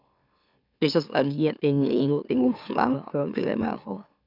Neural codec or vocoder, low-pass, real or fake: autoencoder, 44.1 kHz, a latent of 192 numbers a frame, MeloTTS; 5.4 kHz; fake